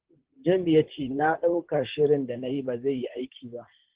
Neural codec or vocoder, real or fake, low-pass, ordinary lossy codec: vocoder, 44.1 kHz, 128 mel bands, Pupu-Vocoder; fake; 3.6 kHz; Opus, 16 kbps